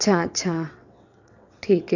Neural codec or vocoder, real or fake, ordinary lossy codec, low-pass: vocoder, 22.05 kHz, 80 mel bands, WaveNeXt; fake; none; 7.2 kHz